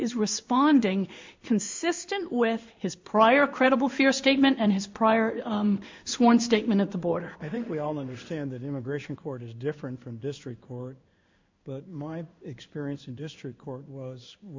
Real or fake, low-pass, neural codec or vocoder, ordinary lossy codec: fake; 7.2 kHz; codec, 16 kHz in and 24 kHz out, 1 kbps, XY-Tokenizer; MP3, 64 kbps